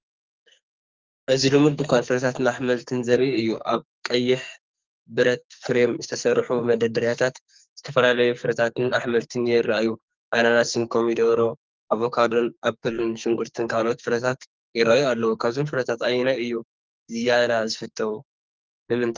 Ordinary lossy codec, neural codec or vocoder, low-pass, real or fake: Opus, 32 kbps; codec, 44.1 kHz, 2.6 kbps, SNAC; 7.2 kHz; fake